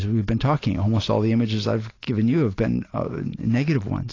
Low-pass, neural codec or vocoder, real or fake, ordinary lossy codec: 7.2 kHz; none; real; AAC, 32 kbps